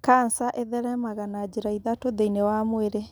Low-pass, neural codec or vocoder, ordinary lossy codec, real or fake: none; none; none; real